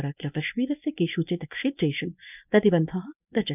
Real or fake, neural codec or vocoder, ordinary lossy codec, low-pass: fake; codec, 24 kHz, 0.9 kbps, WavTokenizer, medium speech release version 1; none; 3.6 kHz